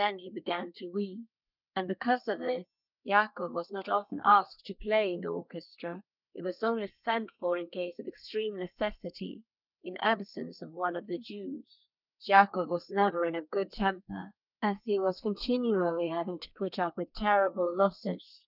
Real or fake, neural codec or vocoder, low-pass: fake; codec, 32 kHz, 1.9 kbps, SNAC; 5.4 kHz